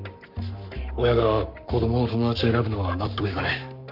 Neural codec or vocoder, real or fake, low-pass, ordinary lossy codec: codec, 44.1 kHz, 7.8 kbps, Pupu-Codec; fake; 5.4 kHz; none